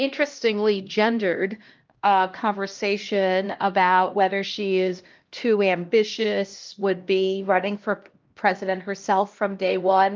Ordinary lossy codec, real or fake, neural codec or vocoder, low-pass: Opus, 24 kbps; fake; codec, 16 kHz, 1 kbps, X-Codec, HuBERT features, trained on LibriSpeech; 7.2 kHz